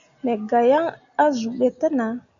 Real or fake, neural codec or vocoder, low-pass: real; none; 7.2 kHz